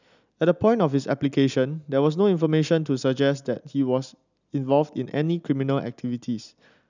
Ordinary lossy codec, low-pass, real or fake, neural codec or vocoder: none; 7.2 kHz; real; none